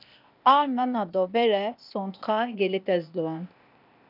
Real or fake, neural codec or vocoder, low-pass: fake; codec, 16 kHz, 0.8 kbps, ZipCodec; 5.4 kHz